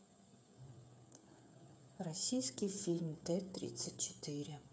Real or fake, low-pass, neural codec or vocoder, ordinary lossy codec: fake; none; codec, 16 kHz, 8 kbps, FreqCodec, smaller model; none